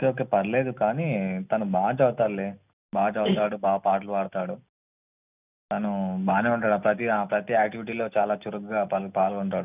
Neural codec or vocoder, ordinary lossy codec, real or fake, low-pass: none; none; real; 3.6 kHz